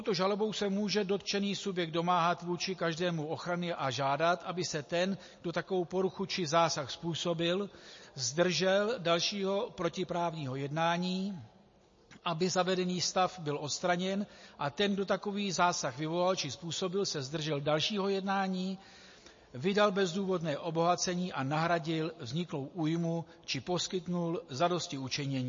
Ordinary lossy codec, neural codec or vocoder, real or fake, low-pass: MP3, 32 kbps; none; real; 7.2 kHz